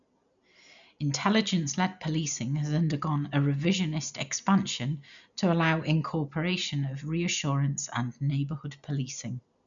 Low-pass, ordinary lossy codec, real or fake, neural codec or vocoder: 7.2 kHz; none; real; none